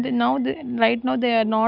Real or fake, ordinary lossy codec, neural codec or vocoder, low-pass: real; none; none; 5.4 kHz